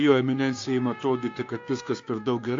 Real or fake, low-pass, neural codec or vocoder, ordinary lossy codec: fake; 7.2 kHz; codec, 16 kHz, 6 kbps, DAC; AAC, 48 kbps